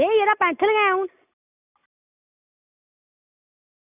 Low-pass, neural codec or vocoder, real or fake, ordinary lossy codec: 3.6 kHz; none; real; none